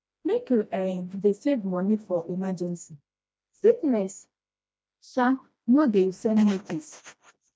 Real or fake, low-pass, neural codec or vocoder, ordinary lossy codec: fake; none; codec, 16 kHz, 1 kbps, FreqCodec, smaller model; none